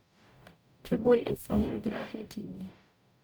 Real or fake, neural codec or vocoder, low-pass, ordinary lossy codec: fake; codec, 44.1 kHz, 0.9 kbps, DAC; none; none